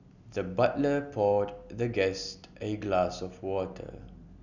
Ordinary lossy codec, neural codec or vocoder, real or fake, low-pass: none; none; real; 7.2 kHz